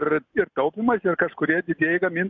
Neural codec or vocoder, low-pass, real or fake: none; 7.2 kHz; real